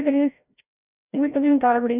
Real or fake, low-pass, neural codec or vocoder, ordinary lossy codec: fake; 3.6 kHz; codec, 16 kHz, 0.5 kbps, FreqCodec, larger model; none